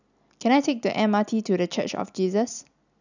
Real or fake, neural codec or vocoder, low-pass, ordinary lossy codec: real; none; 7.2 kHz; none